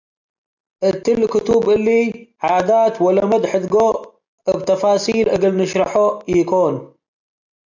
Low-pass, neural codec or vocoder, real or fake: 7.2 kHz; none; real